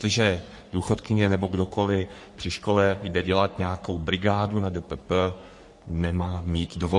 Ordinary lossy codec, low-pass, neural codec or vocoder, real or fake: MP3, 48 kbps; 10.8 kHz; codec, 44.1 kHz, 3.4 kbps, Pupu-Codec; fake